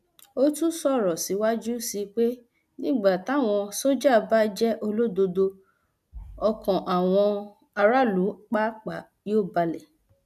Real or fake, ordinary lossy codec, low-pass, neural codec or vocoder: real; none; 14.4 kHz; none